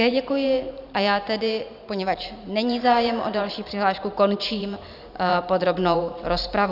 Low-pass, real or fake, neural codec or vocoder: 5.4 kHz; fake; vocoder, 44.1 kHz, 128 mel bands every 512 samples, BigVGAN v2